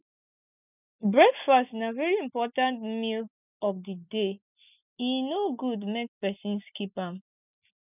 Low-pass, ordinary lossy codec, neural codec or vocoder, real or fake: 3.6 kHz; none; none; real